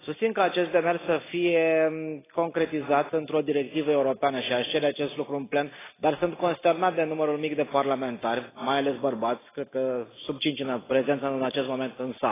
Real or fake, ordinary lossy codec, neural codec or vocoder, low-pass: real; AAC, 16 kbps; none; 3.6 kHz